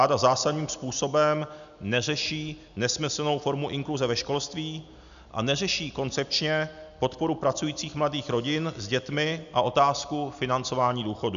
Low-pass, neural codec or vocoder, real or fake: 7.2 kHz; none; real